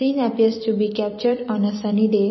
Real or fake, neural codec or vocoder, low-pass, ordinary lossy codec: fake; vocoder, 44.1 kHz, 128 mel bands every 256 samples, BigVGAN v2; 7.2 kHz; MP3, 24 kbps